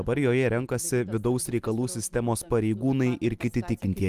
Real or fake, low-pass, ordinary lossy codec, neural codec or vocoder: real; 14.4 kHz; Opus, 32 kbps; none